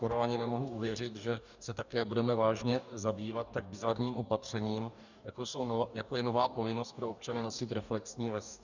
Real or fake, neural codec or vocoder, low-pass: fake; codec, 44.1 kHz, 2.6 kbps, DAC; 7.2 kHz